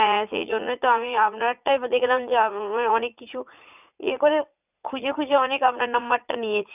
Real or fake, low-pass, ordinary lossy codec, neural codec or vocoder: fake; 3.6 kHz; none; vocoder, 44.1 kHz, 80 mel bands, Vocos